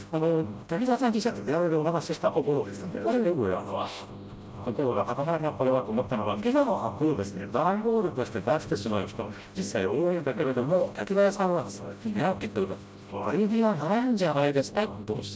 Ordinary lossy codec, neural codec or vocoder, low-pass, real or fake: none; codec, 16 kHz, 0.5 kbps, FreqCodec, smaller model; none; fake